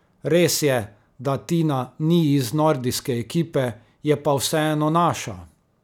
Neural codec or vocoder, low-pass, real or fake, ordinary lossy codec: none; 19.8 kHz; real; none